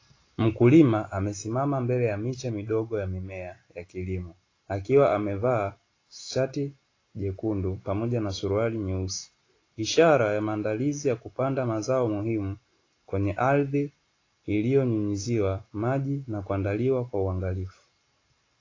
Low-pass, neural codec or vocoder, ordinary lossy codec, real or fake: 7.2 kHz; none; AAC, 32 kbps; real